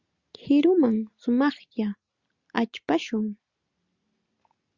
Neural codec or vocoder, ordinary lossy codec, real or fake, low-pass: none; Opus, 64 kbps; real; 7.2 kHz